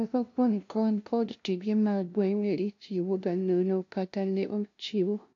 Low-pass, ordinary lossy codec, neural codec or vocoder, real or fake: 7.2 kHz; AAC, 48 kbps; codec, 16 kHz, 0.5 kbps, FunCodec, trained on LibriTTS, 25 frames a second; fake